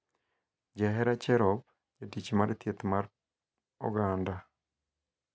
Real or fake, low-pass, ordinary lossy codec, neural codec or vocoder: real; none; none; none